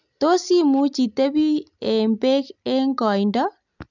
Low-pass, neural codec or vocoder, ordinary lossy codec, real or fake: 7.2 kHz; none; none; real